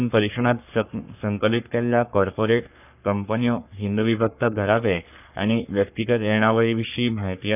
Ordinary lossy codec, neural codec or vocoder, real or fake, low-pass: none; codec, 44.1 kHz, 3.4 kbps, Pupu-Codec; fake; 3.6 kHz